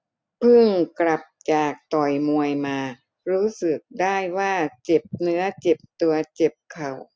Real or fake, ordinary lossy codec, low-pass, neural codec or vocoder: real; none; none; none